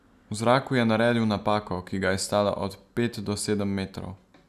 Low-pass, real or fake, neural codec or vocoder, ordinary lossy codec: 14.4 kHz; real; none; none